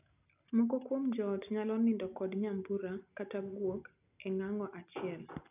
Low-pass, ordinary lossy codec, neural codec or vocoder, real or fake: 3.6 kHz; none; none; real